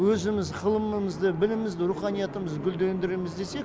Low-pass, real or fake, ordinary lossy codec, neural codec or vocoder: none; real; none; none